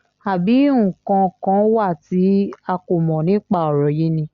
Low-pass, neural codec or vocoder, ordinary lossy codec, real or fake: 7.2 kHz; none; Opus, 64 kbps; real